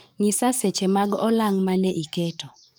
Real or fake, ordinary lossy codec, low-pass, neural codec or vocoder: fake; none; none; codec, 44.1 kHz, 7.8 kbps, DAC